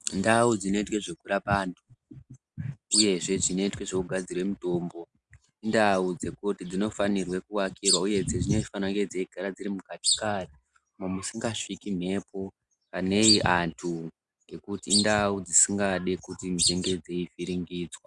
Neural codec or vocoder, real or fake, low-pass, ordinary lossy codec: none; real; 10.8 kHz; Opus, 64 kbps